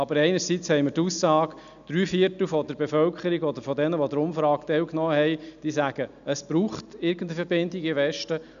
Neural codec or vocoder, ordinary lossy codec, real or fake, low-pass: none; none; real; 7.2 kHz